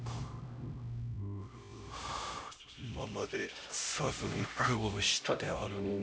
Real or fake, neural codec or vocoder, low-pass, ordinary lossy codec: fake; codec, 16 kHz, 0.5 kbps, X-Codec, HuBERT features, trained on LibriSpeech; none; none